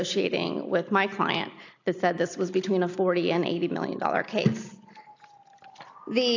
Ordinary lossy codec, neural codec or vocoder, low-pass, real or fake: AAC, 48 kbps; none; 7.2 kHz; real